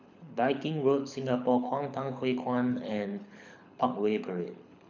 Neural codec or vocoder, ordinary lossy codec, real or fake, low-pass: codec, 24 kHz, 6 kbps, HILCodec; none; fake; 7.2 kHz